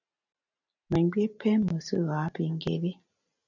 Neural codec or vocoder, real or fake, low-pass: none; real; 7.2 kHz